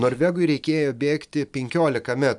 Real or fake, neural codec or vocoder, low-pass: real; none; 10.8 kHz